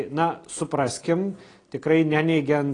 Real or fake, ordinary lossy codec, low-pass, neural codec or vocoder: real; AAC, 32 kbps; 9.9 kHz; none